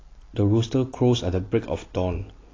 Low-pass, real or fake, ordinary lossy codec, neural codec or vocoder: 7.2 kHz; real; AAC, 32 kbps; none